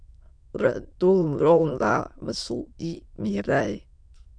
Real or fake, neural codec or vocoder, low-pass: fake; autoencoder, 22.05 kHz, a latent of 192 numbers a frame, VITS, trained on many speakers; 9.9 kHz